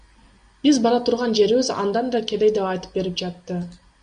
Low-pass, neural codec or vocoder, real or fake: 9.9 kHz; none; real